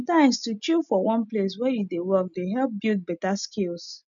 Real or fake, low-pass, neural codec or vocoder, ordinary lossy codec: real; 7.2 kHz; none; none